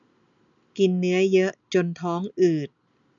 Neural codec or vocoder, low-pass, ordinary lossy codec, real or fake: none; 7.2 kHz; none; real